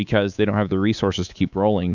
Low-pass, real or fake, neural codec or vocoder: 7.2 kHz; fake; codec, 24 kHz, 3.1 kbps, DualCodec